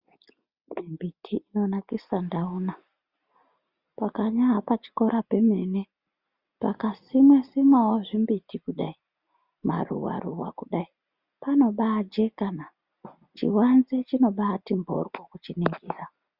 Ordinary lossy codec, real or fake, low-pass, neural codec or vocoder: Opus, 64 kbps; real; 5.4 kHz; none